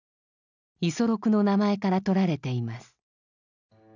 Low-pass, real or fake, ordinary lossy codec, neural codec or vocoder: 7.2 kHz; real; none; none